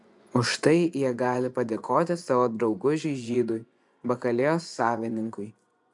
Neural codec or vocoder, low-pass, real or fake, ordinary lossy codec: vocoder, 24 kHz, 100 mel bands, Vocos; 10.8 kHz; fake; MP3, 96 kbps